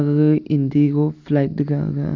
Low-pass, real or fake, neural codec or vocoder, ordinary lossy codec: 7.2 kHz; real; none; AAC, 48 kbps